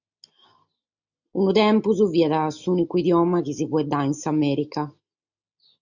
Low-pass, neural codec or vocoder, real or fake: 7.2 kHz; none; real